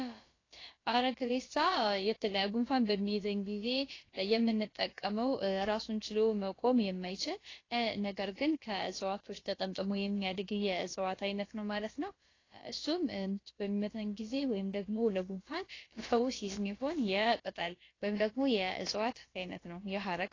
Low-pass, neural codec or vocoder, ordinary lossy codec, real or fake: 7.2 kHz; codec, 16 kHz, about 1 kbps, DyCAST, with the encoder's durations; AAC, 32 kbps; fake